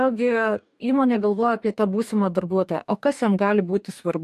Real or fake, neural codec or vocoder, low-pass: fake; codec, 44.1 kHz, 2.6 kbps, DAC; 14.4 kHz